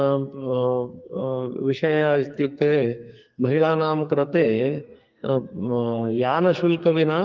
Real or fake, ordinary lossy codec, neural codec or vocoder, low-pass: fake; Opus, 24 kbps; codec, 44.1 kHz, 2.6 kbps, SNAC; 7.2 kHz